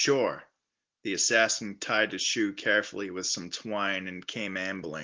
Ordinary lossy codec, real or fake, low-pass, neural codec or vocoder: Opus, 16 kbps; real; 7.2 kHz; none